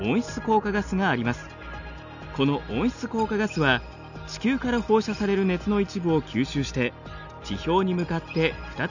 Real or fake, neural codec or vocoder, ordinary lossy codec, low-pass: real; none; none; 7.2 kHz